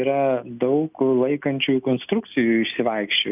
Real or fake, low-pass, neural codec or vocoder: real; 3.6 kHz; none